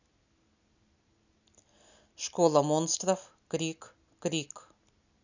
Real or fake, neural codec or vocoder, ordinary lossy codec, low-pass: real; none; none; 7.2 kHz